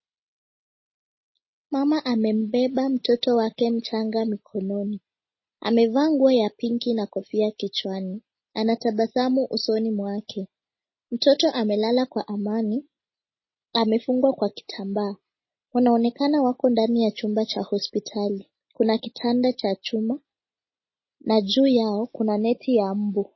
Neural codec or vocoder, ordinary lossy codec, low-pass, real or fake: none; MP3, 24 kbps; 7.2 kHz; real